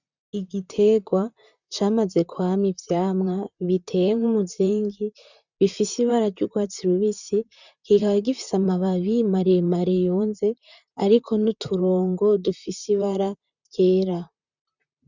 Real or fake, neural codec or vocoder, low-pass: fake; vocoder, 44.1 kHz, 128 mel bands, Pupu-Vocoder; 7.2 kHz